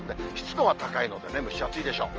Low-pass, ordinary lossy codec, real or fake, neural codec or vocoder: 7.2 kHz; Opus, 24 kbps; real; none